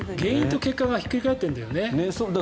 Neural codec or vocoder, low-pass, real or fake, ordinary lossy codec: none; none; real; none